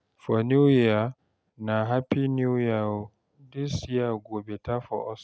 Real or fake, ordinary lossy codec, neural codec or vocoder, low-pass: real; none; none; none